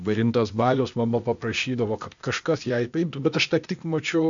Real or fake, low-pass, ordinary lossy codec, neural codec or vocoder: fake; 7.2 kHz; MP3, 64 kbps; codec, 16 kHz, 0.8 kbps, ZipCodec